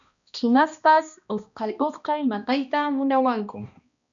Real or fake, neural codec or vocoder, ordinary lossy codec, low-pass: fake; codec, 16 kHz, 1 kbps, X-Codec, HuBERT features, trained on balanced general audio; MP3, 96 kbps; 7.2 kHz